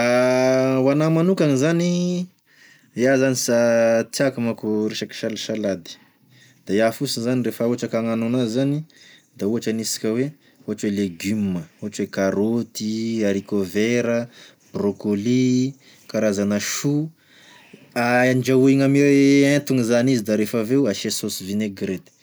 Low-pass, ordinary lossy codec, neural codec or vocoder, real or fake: none; none; none; real